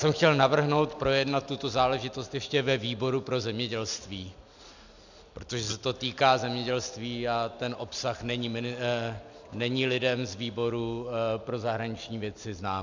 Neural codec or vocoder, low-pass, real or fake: none; 7.2 kHz; real